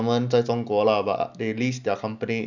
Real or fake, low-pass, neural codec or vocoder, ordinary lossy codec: real; 7.2 kHz; none; none